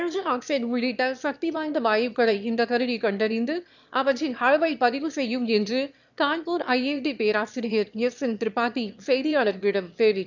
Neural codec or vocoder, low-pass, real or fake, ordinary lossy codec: autoencoder, 22.05 kHz, a latent of 192 numbers a frame, VITS, trained on one speaker; 7.2 kHz; fake; none